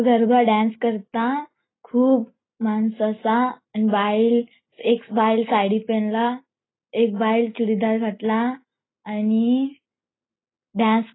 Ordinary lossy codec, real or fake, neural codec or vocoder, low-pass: AAC, 16 kbps; real; none; 7.2 kHz